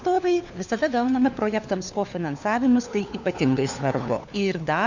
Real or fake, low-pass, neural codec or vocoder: fake; 7.2 kHz; codec, 16 kHz, 4 kbps, FunCodec, trained on LibriTTS, 50 frames a second